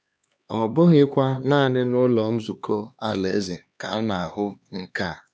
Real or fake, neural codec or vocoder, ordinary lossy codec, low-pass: fake; codec, 16 kHz, 2 kbps, X-Codec, HuBERT features, trained on LibriSpeech; none; none